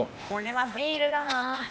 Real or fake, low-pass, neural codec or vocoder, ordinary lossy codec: fake; none; codec, 16 kHz, 0.8 kbps, ZipCodec; none